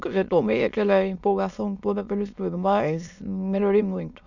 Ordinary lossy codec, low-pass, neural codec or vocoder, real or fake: none; 7.2 kHz; autoencoder, 22.05 kHz, a latent of 192 numbers a frame, VITS, trained on many speakers; fake